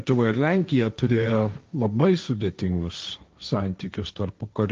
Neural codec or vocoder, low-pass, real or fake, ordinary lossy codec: codec, 16 kHz, 1.1 kbps, Voila-Tokenizer; 7.2 kHz; fake; Opus, 24 kbps